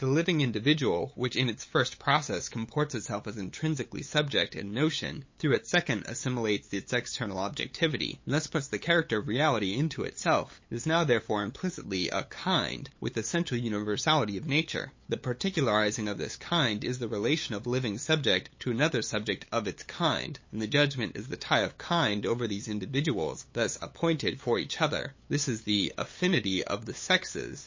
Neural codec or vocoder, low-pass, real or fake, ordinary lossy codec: codec, 16 kHz, 8 kbps, FunCodec, trained on LibriTTS, 25 frames a second; 7.2 kHz; fake; MP3, 32 kbps